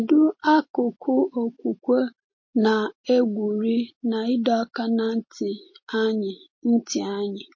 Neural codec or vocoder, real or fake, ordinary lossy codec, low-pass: none; real; MP3, 32 kbps; 7.2 kHz